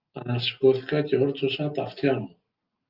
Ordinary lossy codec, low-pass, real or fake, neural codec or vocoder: Opus, 32 kbps; 5.4 kHz; real; none